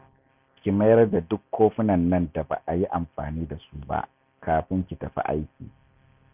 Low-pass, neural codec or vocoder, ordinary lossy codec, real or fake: 3.6 kHz; none; none; real